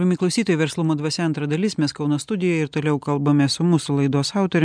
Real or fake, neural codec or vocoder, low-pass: real; none; 9.9 kHz